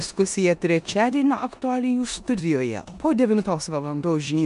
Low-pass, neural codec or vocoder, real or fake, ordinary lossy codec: 10.8 kHz; codec, 16 kHz in and 24 kHz out, 0.9 kbps, LongCat-Audio-Codec, four codebook decoder; fake; AAC, 96 kbps